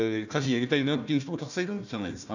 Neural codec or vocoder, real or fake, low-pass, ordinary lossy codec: codec, 16 kHz, 1 kbps, FunCodec, trained on Chinese and English, 50 frames a second; fake; 7.2 kHz; none